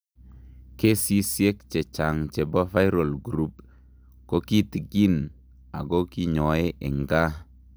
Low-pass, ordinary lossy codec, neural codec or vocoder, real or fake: none; none; none; real